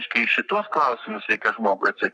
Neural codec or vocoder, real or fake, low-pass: codec, 44.1 kHz, 3.4 kbps, Pupu-Codec; fake; 10.8 kHz